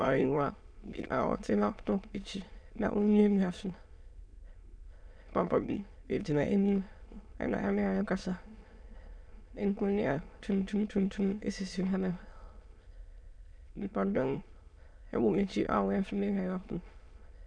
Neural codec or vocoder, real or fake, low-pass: autoencoder, 22.05 kHz, a latent of 192 numbers a frame, VITS, trained on many speakers; fake; 9.9 kHz